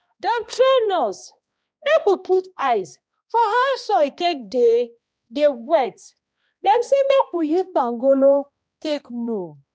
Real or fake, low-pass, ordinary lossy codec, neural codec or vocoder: fake; none; none; codec, 16 kHz, 1 kbps, X-Codec, HuBERT features, trained on balanced general audio